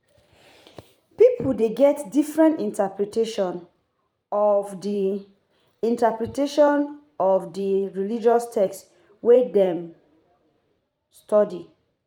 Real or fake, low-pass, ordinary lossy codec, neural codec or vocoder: fake; 19.8 kHz; none; vocoder, 44.1 kHz, 128 mel bands every 512 samples, BigVGAN v2